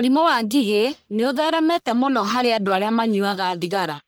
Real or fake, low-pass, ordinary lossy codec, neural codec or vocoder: fake; none; none; codec, 44.1 kHz, 1.7 kbps, Pupu-Codec